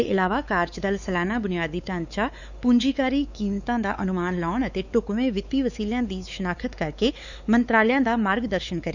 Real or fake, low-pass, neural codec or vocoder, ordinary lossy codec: fake; 7.2 kHz; codec, 16 kHz, 4 kbps, X-Codec, WavLM features, trained on Multilingual LibriSpeech; none